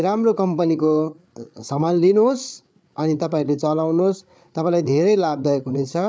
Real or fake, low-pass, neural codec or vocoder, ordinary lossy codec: fake; none; codec, 16 kHz, 8 kbps, FreqCodec, larger model; none